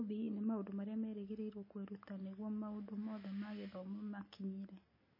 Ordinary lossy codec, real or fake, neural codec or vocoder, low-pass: MP3, 24 kbps; real; none; 5.4 kHz